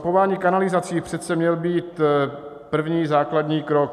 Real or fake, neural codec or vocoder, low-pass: real; none; 14.4 kHz